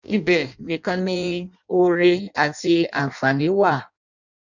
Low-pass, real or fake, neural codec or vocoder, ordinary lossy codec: 7.2 kHz; fake; codec, 16 kHz in and 24 kHz out, 0.6 kbps, FireRedTTS-2 codec; none